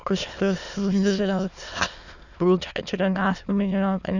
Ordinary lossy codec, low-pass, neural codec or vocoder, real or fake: none; 7.2 kHz; autoencoder, 22.05 kHz, a latent of 192 numbers a frame, VITS, trained on many speakers; fake